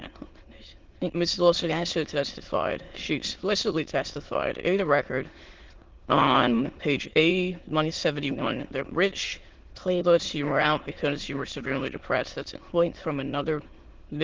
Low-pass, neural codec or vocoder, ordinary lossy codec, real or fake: 7.2 kHz; autoencoder, 22.05 kHz, a latent of 192 numbers a frame, VITS, trained on many speakers; Opus, 16 kbps; fake